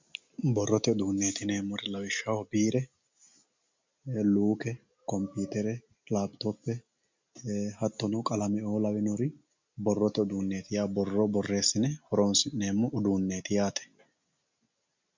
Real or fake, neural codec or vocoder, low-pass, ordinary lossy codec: real; none; 7.2 kHz; MP3, 64 kbps